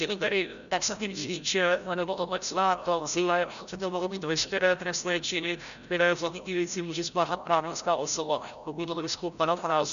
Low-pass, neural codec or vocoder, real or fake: 7.2 kHz; codec, 16 kHz, 0.5 kbps, FreqCodec, larger model; fake